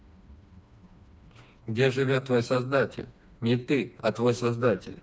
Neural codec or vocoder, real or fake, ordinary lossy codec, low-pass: codec, 16 kHz, 2 kbps, FreqCodec, smaller model; fake; none; none